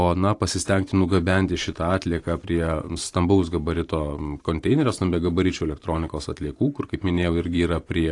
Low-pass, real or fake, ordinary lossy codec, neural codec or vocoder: 10.8 kHz; fake; AAC, 48 kbps; vocoder, 44.1 kHz, 128 mel bands every 512 samples, BigVGAN v2